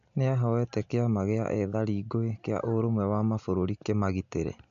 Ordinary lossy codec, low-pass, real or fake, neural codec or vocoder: AAC, 96 kbps; 7.2 kHz; real; none